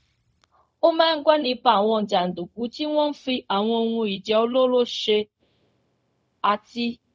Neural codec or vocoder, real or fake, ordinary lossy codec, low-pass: codec, 16 kHz, 0.4 kbps, LongCat-Audio-Codec; fake; none; none